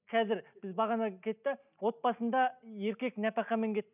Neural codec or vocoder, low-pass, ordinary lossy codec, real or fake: none; 3.6 kHz; none; real